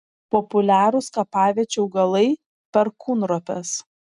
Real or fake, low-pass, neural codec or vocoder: real; 10.8 kHz; none